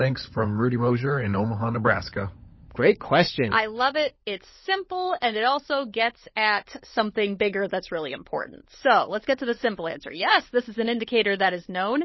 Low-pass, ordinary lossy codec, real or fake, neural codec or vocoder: 7.2 kHz; MP3, 24 kbps; fake; codec, 16 kHz, 16 kbps, FunCodec, trained on LibriTTS, 50 frames a second